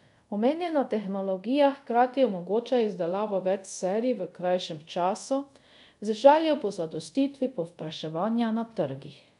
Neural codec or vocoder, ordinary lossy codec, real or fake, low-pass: codec, 24 kHz, 0.5 kbps, DualCodec; none; fake; 10.8 kHz